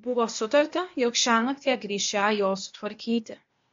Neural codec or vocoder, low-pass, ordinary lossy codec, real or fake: codec, 16 kHz, 0.8 kbps, ZipCodec; 7.2 kHz; MP3, 48 kbps; fake